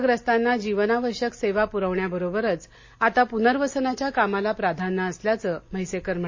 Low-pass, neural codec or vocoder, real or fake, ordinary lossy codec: 7.2 kHz; none; real; MP3, 32 kbps